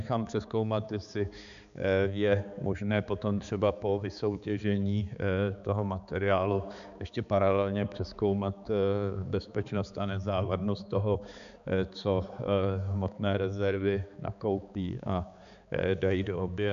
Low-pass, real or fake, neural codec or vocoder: 7.2 kHz; fake; codec, 16 kHz, 4 kbps, X-Codec, HuBERT features, trained on balanced general audio